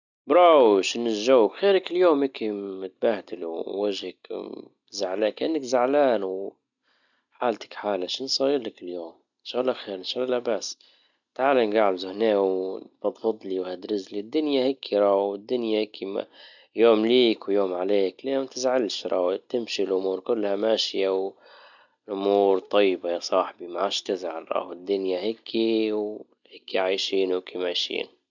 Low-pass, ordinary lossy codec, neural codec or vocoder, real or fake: 7.2 kHz; none; none; real